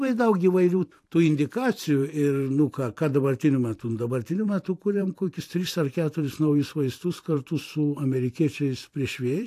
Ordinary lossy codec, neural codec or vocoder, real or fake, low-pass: AAC, 64 kbps; vocoder, 44.1 kHz, 128 mel bands every 512 samples, BigVGAN v2; fake; 14.4 kHz